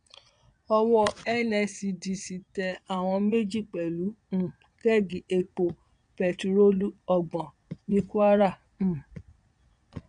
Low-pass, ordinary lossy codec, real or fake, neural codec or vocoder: 9.9 kHz; none; fake; vocoder, 22.05 kHz, 80 mel bands, Vocos